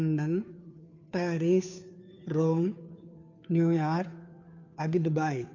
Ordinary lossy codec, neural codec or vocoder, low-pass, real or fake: none; codec, 16 kHz, 2 kbps, FunCodec, trained on Chinese and English, 25 frames a second; 7.2 kHz; fake